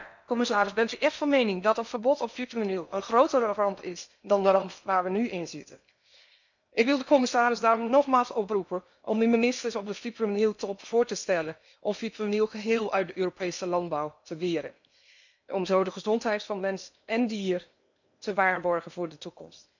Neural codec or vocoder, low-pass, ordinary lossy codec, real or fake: codec, 16 kHz in and 24 kHz out, 0.8 kbps, FocalCodec, streaming, 65536 codes; 7.2 kHz; none; fake